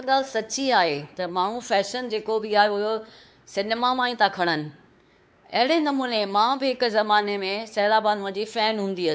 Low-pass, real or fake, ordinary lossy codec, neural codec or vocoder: none; fake; none; codec, 16 kHz, 4 kbps, X-Codec, WavLM features, trained on Multilingual LibriSpeech